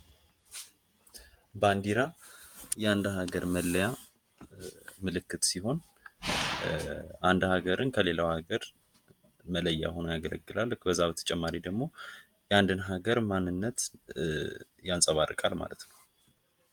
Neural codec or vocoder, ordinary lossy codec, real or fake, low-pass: vocoder, 48 kHz, 128 mel bands, Vocos; Opus, 32 kbps; fake; 19.8 kHz